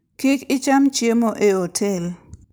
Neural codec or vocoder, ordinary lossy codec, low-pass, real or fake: none; none; none; real